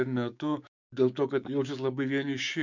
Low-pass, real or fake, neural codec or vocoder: 7.2 kHz; fake; codec, 16 kHz, 6 kbps, DAC